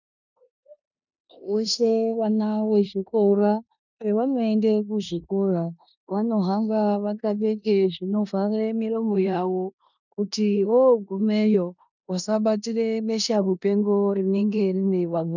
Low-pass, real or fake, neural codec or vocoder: 7.2 kHz; fake; codec, 16 kHz in and 24 kHz out, 0.9 kbps, LongCat-Audio-Codec, four codebook decoder